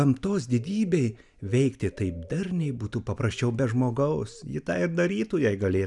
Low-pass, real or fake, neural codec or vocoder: 10.8 kHz; real; none